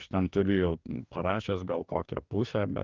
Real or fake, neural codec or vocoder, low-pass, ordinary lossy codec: fake; codec, 44.1 kHz, 2.6 kbps, DAC; 7.2 kHz; Opus, 32 kbps